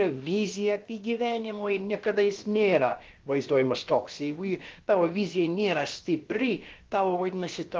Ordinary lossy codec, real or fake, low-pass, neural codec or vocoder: Opus, 32 kbps; fake; 7.2 kHz; codec, 16 kHz, about 1 kbps, DyCAST, with the encoder's durations